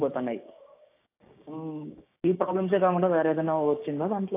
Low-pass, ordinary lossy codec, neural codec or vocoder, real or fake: 3.6 kHz; AAC, 24 kbps; codec, 16 kHz, 6 kbps, DAC; fake